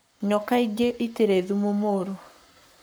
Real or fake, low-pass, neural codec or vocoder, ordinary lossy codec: fake; none; codec, 44.1 kHz, 7.8 kbps, Pupu-Codec; none